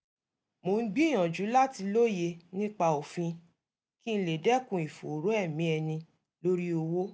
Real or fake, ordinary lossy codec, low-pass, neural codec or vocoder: real; none; none; none